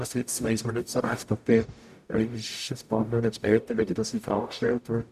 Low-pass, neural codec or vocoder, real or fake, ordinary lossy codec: 14.4 kHz; codec, 44.1 kHz, 0.9 kbps, DAC; fake; none